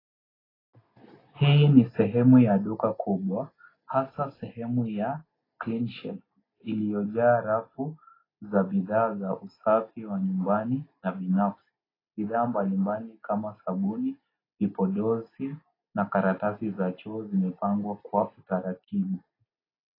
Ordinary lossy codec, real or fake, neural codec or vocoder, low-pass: AAC, 24 kbps; real; none; 5.4 kHz